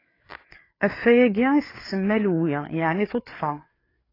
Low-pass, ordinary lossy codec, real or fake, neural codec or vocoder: 5.4 kHz; AAC, 24 kbps; fake; codec, 16 kHz, 4 kbps, FreqCodec, larger model